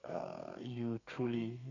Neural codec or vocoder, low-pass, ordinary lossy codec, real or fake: codec, 32 kHz, 1.9 kbps, SNAC; 7.2 kHz; none; fake